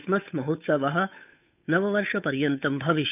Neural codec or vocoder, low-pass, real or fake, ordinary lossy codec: codec, 16 kHz, 8 kbps, FunCodec, trained on Chinese and English, 25 frames a second; 3.6 kHz; fake; none